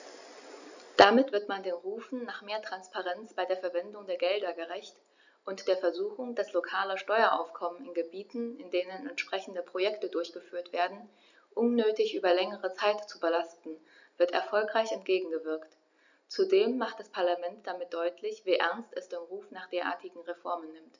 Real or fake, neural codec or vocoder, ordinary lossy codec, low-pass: real; none; none; 7.2 kHz